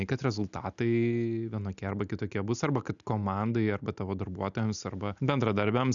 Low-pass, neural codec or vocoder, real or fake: 7.2 kHz; none; real